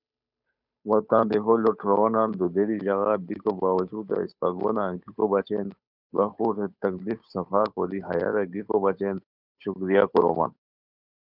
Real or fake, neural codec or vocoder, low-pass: fake; codec, 16 kHz, 8 kbps, FunCodec, trained on Chinese and English, 25 frames a second; 5.4 kHz